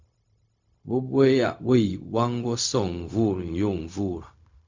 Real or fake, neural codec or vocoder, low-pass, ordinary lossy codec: fake; codec, 16 kHz, 0.4 kbps, LongCat-Audio-Codec; 7.2 kHz; MP3, 64 kbps